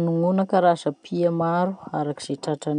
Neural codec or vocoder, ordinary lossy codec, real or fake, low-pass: none; none; real; 9.9 kHz